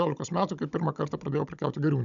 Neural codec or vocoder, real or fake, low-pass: codec, 16 kHz, 16 kbps, FunCodec, trained on Chinese and English, 50 frames a second; fake; 7.2 kHz